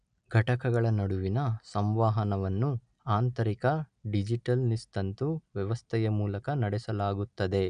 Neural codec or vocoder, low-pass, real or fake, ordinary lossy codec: none; 9.9 kHz; real; none